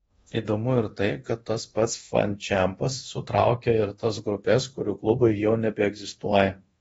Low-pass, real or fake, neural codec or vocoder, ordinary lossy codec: 10.8 kHz; fake; codec, 24 kHz, 0.9 kbps, DualCodec; AAC, 24 kbps